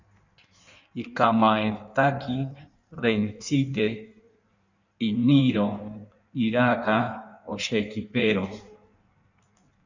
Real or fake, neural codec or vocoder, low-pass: fake; codec, 16 kHz in and 24 kHz out, 1.1 kbps, FireRedTTS-2 codec; 7.2 kHz